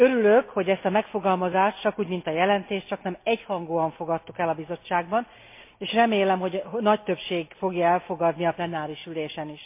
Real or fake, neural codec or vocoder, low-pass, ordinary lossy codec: real; none; 3.6 kHz; MP3, 24 kbps